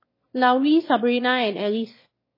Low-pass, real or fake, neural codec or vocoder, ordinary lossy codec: 5.4 kHz; fake; autoencoder, 22.05 kHz, a latent of 192 numbers a frame, VITS, trained on one speaker; MP3, 24 kbps